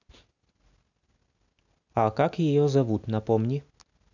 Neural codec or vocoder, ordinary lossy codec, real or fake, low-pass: none; none; real; 7.2 kHz